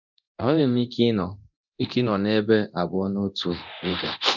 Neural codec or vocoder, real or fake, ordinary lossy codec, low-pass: codec, 24 kHz, 0.9 kbps, DualCodec; fake; none; 7.2 kHz